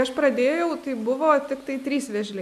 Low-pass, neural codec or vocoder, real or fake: 14.4 kHz; none; real